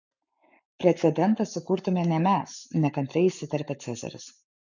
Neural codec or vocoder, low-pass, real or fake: codec, 44.1 kHz, 7.8 kbps, Pupu-Codec; 7.2 kHz; fake